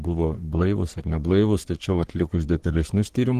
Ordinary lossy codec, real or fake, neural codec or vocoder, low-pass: Opus, 16 kbps; fake; codec, 44.1 kHz, 3.4 kbps, Pupu-Codec; 14.4 kHz